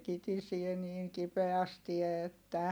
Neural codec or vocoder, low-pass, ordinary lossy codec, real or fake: none; none; none; real